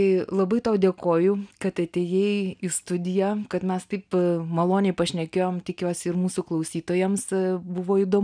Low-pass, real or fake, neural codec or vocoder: 9.9 kHz; real; none